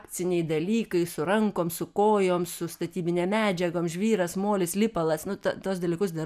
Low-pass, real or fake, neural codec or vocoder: 14.4 kHz; real; none